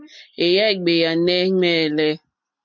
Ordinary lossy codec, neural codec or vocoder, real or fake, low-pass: MP3, 48 kbps; none; real; 7.2 kHz